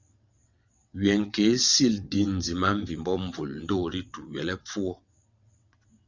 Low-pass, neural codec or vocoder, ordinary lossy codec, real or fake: 7.2 kHz; vocoder, 22.05 kHz, 80 mel bands, WaveNeXt; Opus, 64 kbps; fake